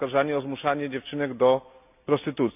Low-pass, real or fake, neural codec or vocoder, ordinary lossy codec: 3.6 kHz; real; none; none